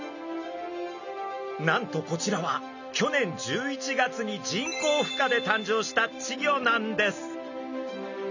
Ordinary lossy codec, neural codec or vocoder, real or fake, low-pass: none; none; real; 7.2 kHz